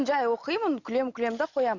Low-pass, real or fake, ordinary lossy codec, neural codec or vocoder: 7.2 kHz; real; Opus, 64 kbps; none